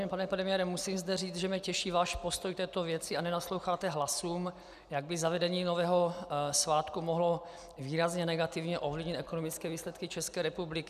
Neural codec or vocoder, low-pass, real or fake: none; 14.4 kHz; real